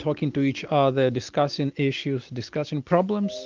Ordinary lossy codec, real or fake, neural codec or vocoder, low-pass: Opus, 32 kbps; real; none; 7.2 kHz